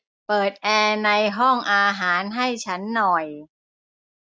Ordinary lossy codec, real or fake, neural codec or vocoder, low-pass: none; real; none; none